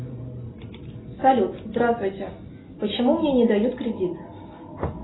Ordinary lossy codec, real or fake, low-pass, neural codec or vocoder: AAC, 16 kbps; real; 7.2 kHz; none